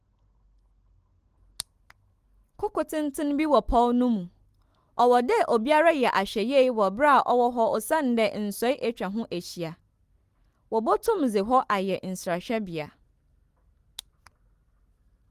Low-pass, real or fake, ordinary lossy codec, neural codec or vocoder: 14.4 kHz; real; Opus, 24 kbps; none